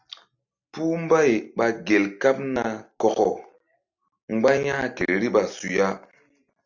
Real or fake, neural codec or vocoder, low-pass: real; none; 7.2 kHz